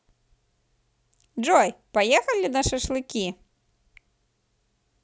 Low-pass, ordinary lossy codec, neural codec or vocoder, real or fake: none; none; none; real